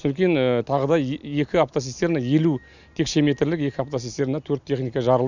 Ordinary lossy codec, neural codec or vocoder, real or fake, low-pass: none; none; real; 7.2 kHz